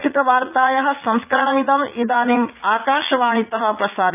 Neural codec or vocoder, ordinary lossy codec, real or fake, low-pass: vocoder, 44.1 kHz, 80 mel bands, Vocos; none; fake; 3.6 kHz